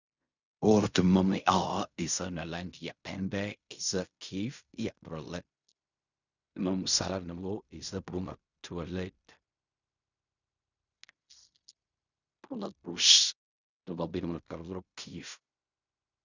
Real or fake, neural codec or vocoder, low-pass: fake; codec, 16 kHz in and 24 kHz out, 0.4 kbps, LongCat-Audio-Codec, fine tuned four codebook decoder; 7.2 kHz